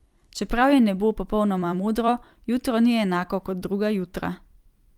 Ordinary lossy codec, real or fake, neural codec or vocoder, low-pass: Opus, 32 kbps; fake; vocoder, 44.1 kHz, 128 mel bands every 256 samples, BigVGAN v2; 19.8 kHz